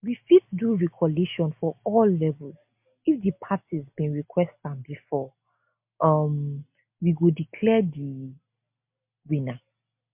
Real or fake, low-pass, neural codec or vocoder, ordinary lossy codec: real; 3.6 kHz; none; MP3, 32 kbps